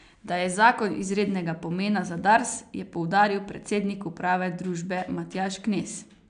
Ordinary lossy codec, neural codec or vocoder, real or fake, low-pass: none; none; real; 9.9 kHz